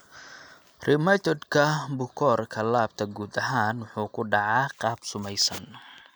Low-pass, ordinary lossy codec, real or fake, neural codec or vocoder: none; none; real; none